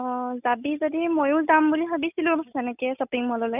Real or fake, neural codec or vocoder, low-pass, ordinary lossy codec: real; none; 3.6 kHz; none